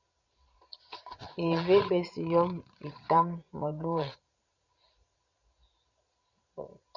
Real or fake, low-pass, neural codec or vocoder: fake; 7.2 kHz; vocoder, 22.05 kHz, 80 mel bands, Vocos